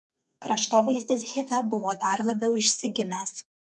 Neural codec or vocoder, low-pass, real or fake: codec, 32 kHz, 1.9 kbps, SNAC; 10.8 kHz; fake